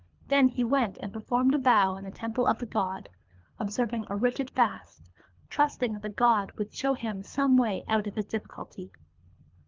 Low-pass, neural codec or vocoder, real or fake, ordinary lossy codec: 7.2 kHz; codec, 24 kHz, 3 kbps, HILCodec; fake; Opus, 24 kbps